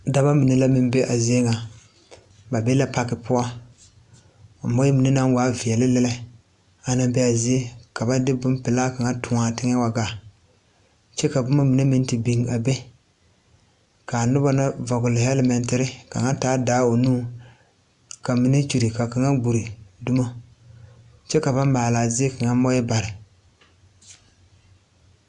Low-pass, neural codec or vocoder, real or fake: 10.8 kHz; none; real